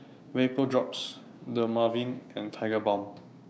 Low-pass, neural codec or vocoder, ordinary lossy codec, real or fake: none; codec, 16 kHz, 6 kbps, DAC; none; fake